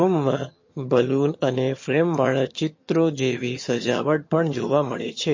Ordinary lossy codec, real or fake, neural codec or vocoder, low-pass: MP3, 32 kbps; fake; vocoder, 22.05 kHz, 80 mel bands, HiFi-GAN; 7.2 kHz